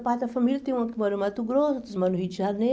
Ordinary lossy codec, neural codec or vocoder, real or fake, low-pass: none; none; real; none